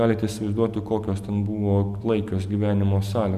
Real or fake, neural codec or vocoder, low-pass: real; none; 14.4 kHz